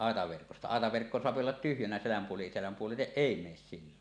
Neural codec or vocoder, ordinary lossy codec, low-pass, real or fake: none; none; 9.9 kHz; real